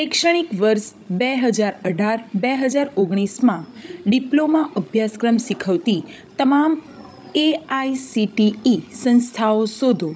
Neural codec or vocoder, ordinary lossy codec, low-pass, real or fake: codec, 16 kHz, 16 kbps, FreqCodec, larger model; none; none; fake